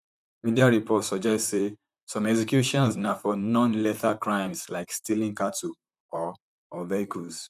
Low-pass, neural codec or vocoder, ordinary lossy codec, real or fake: 14.4 kHz; vocoder, 44.1 kHz, 128 mel bands, Pupu-Vocoder; none; fake